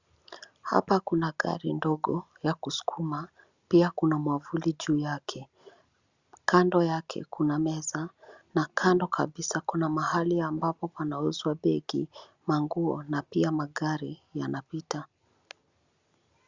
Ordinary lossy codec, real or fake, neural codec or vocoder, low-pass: Opus, 64 kbps; real; none; 7.2 kHz